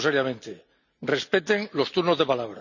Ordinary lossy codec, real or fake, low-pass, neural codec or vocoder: none; real; 7.2 kHz; none